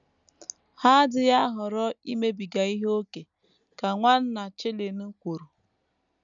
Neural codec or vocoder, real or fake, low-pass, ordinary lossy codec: none; real; 7.2 kHz; none